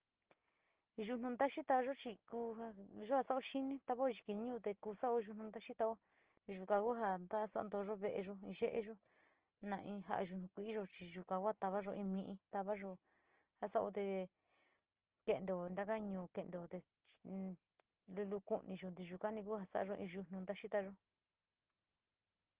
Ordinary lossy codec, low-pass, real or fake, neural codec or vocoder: Opus, 16 kbps; 3.6 kHz; real; none